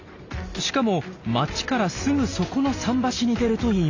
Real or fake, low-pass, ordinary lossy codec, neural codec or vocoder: real; 7.2 kHz; AAC, 48 kbps; none